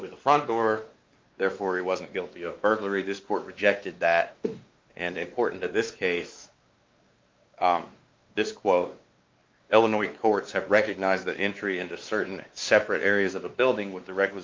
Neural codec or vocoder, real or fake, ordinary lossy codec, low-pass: codec, 16 kHz, 2 kbps, X-Codec, WavLM features, trained on Multilingual LibriSpeech; fake; Opus, 32 kbps; 7.2 kHz